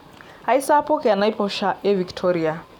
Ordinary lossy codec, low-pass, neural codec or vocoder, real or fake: none; 19.8 kHz; none; real